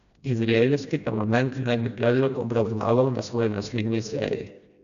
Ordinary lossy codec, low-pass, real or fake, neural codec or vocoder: none; 7.2 kHz; fake; codec, 16 kHz, 1 kbps, FreqCodec, smaller model